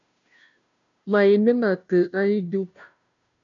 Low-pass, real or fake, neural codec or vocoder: 7.2 kHz; fake; codec, 16 kHz, 0.5 kbps, FunCodec, trained on Chinese and English, 25 frames a second